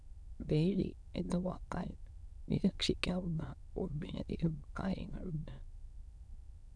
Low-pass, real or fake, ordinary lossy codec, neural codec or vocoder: none; fake; none; autoencoder, 22.05 kHz, a latent of 192 numbers a frame, VITS, trained on many speakers